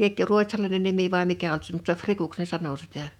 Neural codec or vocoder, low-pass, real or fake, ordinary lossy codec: autoencoder, 48 kHz, 128 numbers a frame, DAC-VAE, trained on Japanese speech; 19.8 kHz; fake; none